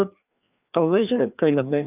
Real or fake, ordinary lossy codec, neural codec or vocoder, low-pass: fake; none; codec, 16 kHz, 2 kbps, FreqCodec, larger model; 3.6 kHz